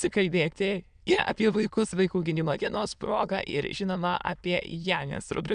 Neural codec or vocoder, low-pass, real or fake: autoencoder, 22.05 kHz, a latent of 192 numbers a frame, VITS, trained on many speakers; 9.9 kHz; fake